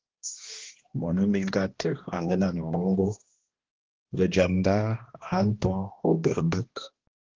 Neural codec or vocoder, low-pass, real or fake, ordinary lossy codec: codec, 16 kHz, 1 kbps, X-Codec, HuBERT features, trained on balanced general audio; 7.2 kHz; fake; Opus, 16 kbps